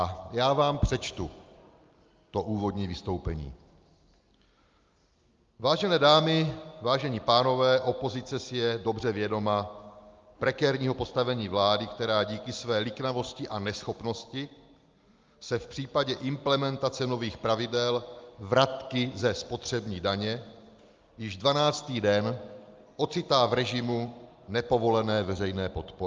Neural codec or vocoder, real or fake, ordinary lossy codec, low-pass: none; real; Opus, 32 kbps; 7.2 kHz